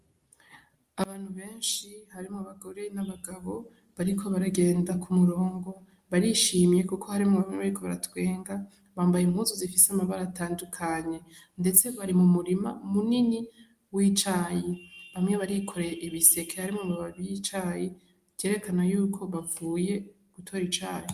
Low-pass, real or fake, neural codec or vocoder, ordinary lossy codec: 14.4 kHz; real; none; Opus, 32 kbps